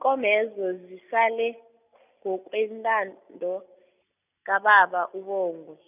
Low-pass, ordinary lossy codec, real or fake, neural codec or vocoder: 3.6 kHz; none; real; none